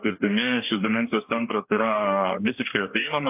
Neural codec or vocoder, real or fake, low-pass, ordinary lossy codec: codec, 44.1 kHz, 2.6 kbps, DAC; fake; 3.6 kHz; AAC, 32 kbps